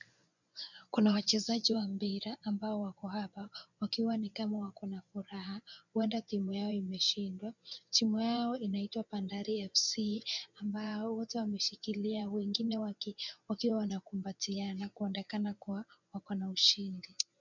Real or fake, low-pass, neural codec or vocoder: fake; 7.2 kHz; vocoder, 24 kHz, 100 mel bands, Vocos